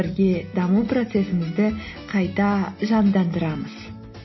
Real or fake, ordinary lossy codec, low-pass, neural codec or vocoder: real; MP3, 24 kbps; 7.2 kHz; none